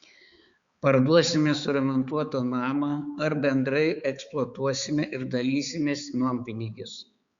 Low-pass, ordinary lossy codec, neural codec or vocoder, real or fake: 7.2 kHz; Opus, 64 kbps; codec, 16 kHz, 4 kbps, X-Codec, HuBERT features, trained on balanced general audio; fake